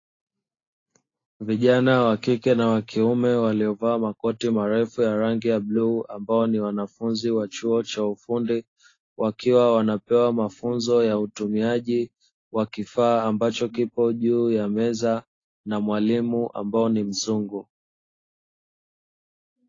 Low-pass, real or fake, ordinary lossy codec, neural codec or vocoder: 7.2 kHz; real; AAC, 32 kbps; none